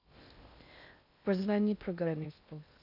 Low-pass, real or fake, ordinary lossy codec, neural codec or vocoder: 5.4 kHz; fake; none; codec, 16 kHz in and 24 kHz out, 0.6 kbps, FocalCodec, streaming, 2048 codes